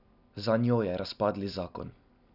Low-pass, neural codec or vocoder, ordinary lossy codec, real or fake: 5.4 kHz; none; none; real